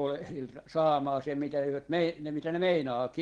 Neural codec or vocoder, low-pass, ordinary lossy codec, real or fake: none; 9.9 kHz; Opus, 16 kbps; real